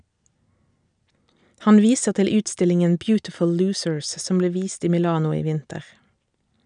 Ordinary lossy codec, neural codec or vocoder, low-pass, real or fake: none; none; 9.9 kHz; real